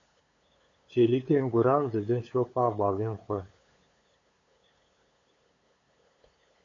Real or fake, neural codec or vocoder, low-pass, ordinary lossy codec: fake; codec, 16 kHz, 8 kbps, FunCodec, trained on LibriTTS, 25 frames a second; 7.2 kHz; AAC, 32 kbps